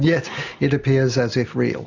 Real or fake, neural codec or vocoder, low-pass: real; none; 7.2 kHz